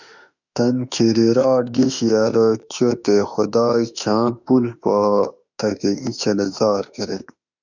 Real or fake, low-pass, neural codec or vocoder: fake; 7.2 kHz; autoencoder, 48 kHz, 32 numbers a frame, DAC-VAE, trained on Japanese speech